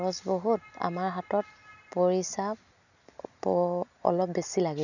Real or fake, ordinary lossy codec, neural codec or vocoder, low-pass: real; none; none; 7.2 kHz